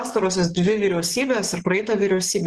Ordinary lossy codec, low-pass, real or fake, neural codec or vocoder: Opus, 16 kbps; 10.8 kHz; fake; codec, 44.1 kHz, 7.8 kbps, DAC